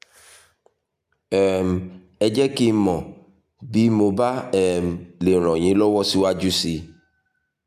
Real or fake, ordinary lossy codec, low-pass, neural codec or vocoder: real; none; 14.4 kHz; none